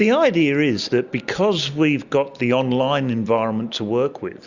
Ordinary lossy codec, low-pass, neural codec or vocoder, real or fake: Opus, 64 kbps; 7.2 kHz; none; real